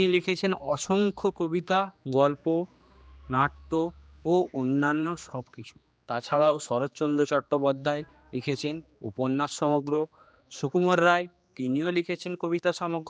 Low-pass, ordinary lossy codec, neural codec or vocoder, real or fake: none; none; codec, 16 kHz, 2 kbps, X-Codec, HuBERT features, trained on general audio; fake